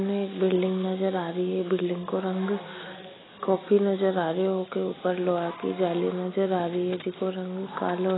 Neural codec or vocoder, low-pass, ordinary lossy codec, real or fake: none; 7.2 kHz; AAC, 16 kbps; real